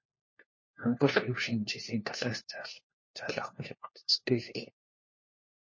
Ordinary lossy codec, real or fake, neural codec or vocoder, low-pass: MP3, 32 kbps; fake; codec, 16 kHz, 1 kbps, FunCodec, trained on LibriTTS, 50 frames a second; 7.2 kHz